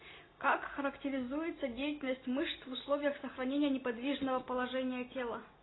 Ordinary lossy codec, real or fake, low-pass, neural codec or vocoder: AAC, 16 kbps; real; 7.2 kHz; none